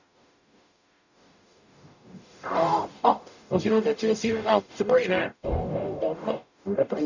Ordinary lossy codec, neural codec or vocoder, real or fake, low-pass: none; codec, 44.1 kHz, 0.9 kbps, DAC; fake; 7.2 kHz